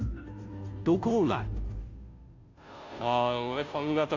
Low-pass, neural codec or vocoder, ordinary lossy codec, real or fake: 7.2 kHz; codec, 16 kHz, 0.5 kbps, FunCodec, trained on Chinese and English, 25 frames a second; AAC, 48 kbps; fake